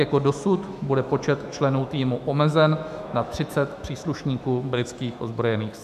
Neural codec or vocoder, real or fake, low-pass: autoencoder, 48 kHz, 128 numbers a frame, DAC-VAE, trained on Japanese speech; fake; 14.4 kHz